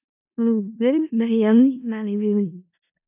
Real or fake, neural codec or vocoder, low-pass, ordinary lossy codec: fake; codec, 16 kHz in and 24 kHz out, 0.4 kbps, LongCat-Audio-Codec, four codebook decoder; 3.6 kHz; AAC, 32 kbps